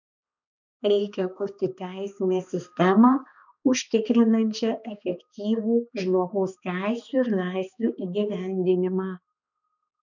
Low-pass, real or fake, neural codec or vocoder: 7.2 kHz; fake; codec, 16 kHz, 2 kbps, X-Codec, HuBERT features, trained on balanced general audio